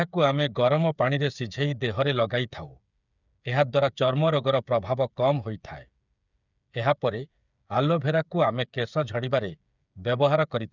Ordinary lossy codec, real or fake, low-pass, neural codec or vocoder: none; fake; 7.2 kHz; codec, 16 kHz, 8 kbps, FreqCodec, smaller model